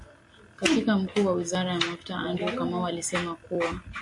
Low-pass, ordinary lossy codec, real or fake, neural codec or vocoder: 10.8 kHz; MP3, 48 kbps; real; none